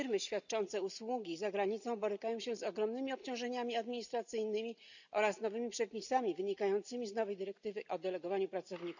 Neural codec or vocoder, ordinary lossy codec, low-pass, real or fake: none; none; 7.2 kHz; real